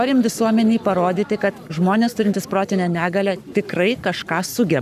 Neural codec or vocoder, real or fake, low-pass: codec, 44.1 kHz, 7.8 kbps, Pupu-Codec; fake; 14.4 kHz